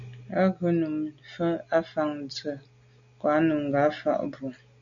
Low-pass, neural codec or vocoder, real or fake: 7.2 kHz; none; real